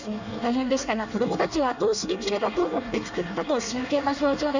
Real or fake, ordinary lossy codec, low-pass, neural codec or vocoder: fake; none; 7.2 kHz; codec, 24 kHz, 1 kbps, SNAC